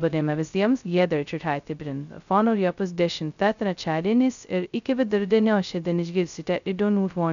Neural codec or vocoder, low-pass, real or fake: codec, 16 kHz, 0.2 kbps, FocalCodec; 7.2 kHz; fake